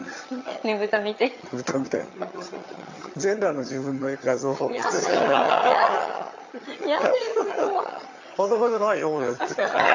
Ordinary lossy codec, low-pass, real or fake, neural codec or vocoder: AAC, 48 kbps; 7.2 kHz; fake; vocoder, 22.05 kHz, 80 mel bands, HiFi-GAN